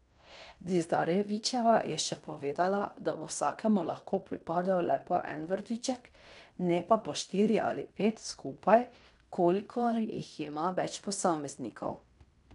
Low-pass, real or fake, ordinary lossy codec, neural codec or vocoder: 10.8 kHz; fake; none; codec, 16 kHz in and 24 kHz out, 0.9 kbps, LongCat-Audio-Codec, fine tuned four codebook decoder